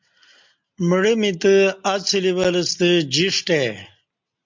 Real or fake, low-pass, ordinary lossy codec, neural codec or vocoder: real; 7.2 kHz; MP3, 64 kbps; none